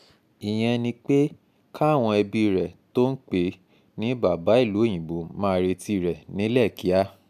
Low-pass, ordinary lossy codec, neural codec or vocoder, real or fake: 14.4 kHz; none; none; real